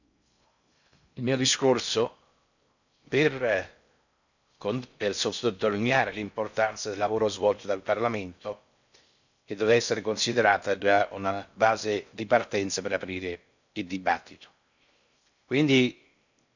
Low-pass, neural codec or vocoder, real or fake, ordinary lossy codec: 7.2 kHz; codec, 16 kHz in and 24 kHz out, 0.6 kbps, FocalCodec, streaming, 4096 codes; fake; none